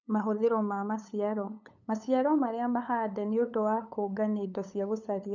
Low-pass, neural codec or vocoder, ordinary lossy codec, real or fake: 7.2 kHz; codec, 16 kHz, 8 kbps, FunCodec, trained on LibriTTS, 25 frames a second; none; fake